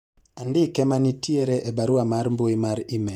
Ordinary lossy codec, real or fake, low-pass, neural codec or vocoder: none; fake; 19.8 kHz; vocoder, 48 kHz, 128 mel bands, Vocos